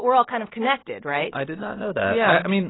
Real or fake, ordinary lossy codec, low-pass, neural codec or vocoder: real; AAC, 16 kbps; 7.2 kHz; none